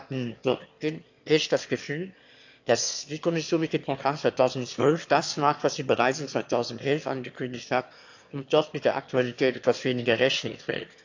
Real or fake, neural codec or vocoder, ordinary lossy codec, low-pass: fake; autoencoder, 22.05 kHz, a latent of 192 numbers a frame, VITS, trained on one speaker; AAC, 48 kbps; 7.2 kHz